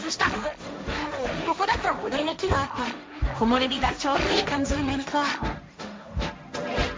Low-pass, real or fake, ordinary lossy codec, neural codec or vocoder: none; fake; none; codec, 16 kHz, 1.1 kbps, Voila-Tokenizer